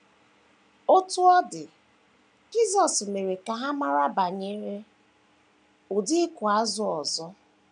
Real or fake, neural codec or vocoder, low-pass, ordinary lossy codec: real; none; 9.9 kHz; MP3, 96 kbps